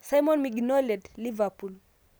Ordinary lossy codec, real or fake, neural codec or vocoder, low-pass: none; real; none; none